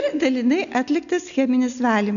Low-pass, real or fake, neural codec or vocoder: 7.2 kHz; real; none